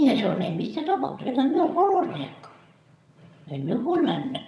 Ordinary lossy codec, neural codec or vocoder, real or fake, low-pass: none; vocoder, 22.05 kHz, 80 mel bands, HiFi-GAN; fake; none